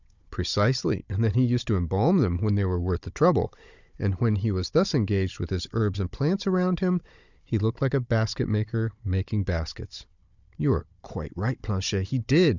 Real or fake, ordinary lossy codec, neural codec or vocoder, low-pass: fake; Opus, 64 kbps; codec, 16 kHz, 16 kbps, FunCodec, trained on Chinese and English, 50 frames a second; 7.2 kHz